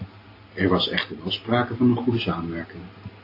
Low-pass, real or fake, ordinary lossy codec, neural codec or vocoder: 5.4 kHz; real; AAC, 32 kbps; none